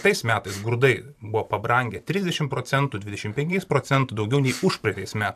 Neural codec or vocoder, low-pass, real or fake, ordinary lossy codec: vocoder, 44.1 kHz, 128 mel bands every 512 samples, BigVGAN v2; 14.4 kHz; fake; AAC, 96 kbps